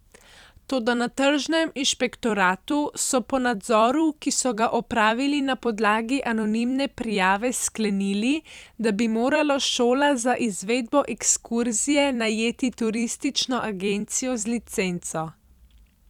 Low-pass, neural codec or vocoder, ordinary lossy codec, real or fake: 19.8 kHz; vocoder, 44.1 kHz, 128 mel bands every 256 samples, BigVGAN v2; none; fake